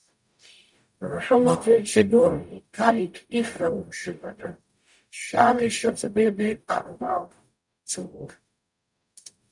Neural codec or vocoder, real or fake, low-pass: codec, 44.1 kHz, 0.9 kbps, DAC; fake; 10.8 kHz